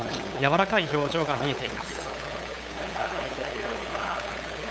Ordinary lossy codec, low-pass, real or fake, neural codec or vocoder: none; none; fake; codec, 16 kHz, 8 kbps, FunCodec, trained on LibriTTS, 25 frames a second